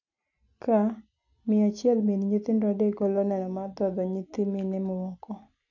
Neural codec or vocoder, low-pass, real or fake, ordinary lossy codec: none; 7.2 kHz; real; none